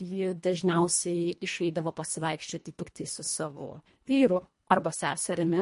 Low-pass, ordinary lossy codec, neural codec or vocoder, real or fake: 10.8 kHz; MP3, 48 kbps; codec, 24 kHz, 1.5 kbps, HILCodec; fake